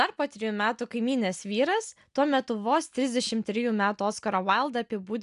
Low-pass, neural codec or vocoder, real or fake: 10.8 kHz; none; real